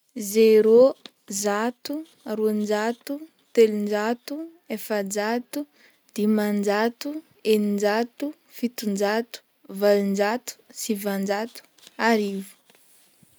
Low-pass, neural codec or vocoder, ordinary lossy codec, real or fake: none; none; none; real